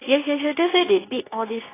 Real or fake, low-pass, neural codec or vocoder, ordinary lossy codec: fake; 3.6 kHz; codec, 24 kHz, 0.9 kbps, WavTokenizer, medium speech release version 2; AAC, 16 kbps